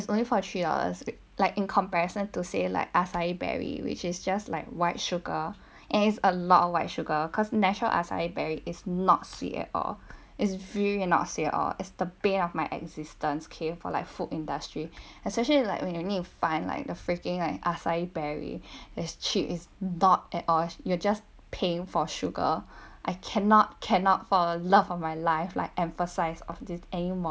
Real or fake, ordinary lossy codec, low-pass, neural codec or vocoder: real; none; none; none